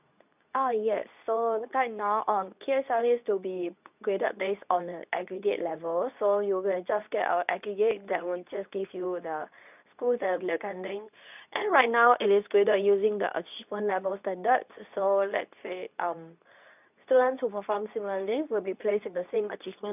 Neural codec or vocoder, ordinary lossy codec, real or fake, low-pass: codec, 24 kHz, 0.9 kbps, WavTokenizer, medium speech release version 2; none; fake; 3.6 kHz